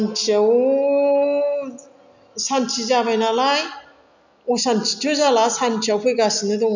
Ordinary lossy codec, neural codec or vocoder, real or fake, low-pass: none; none; real; 7.2 kHz